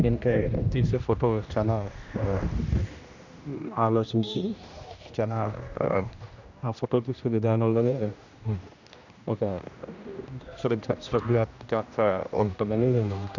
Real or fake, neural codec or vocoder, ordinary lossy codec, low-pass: fake; codec, 16 kHz, 1 kbps, X-Codec, HuBERT features, trained on general audio; none; 7.2 kHz